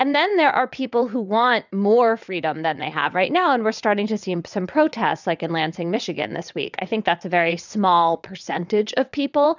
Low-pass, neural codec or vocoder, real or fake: 7.2 kHz; vocoder, 22.05 kHz, 80 mel bands, WaveNeXt; fake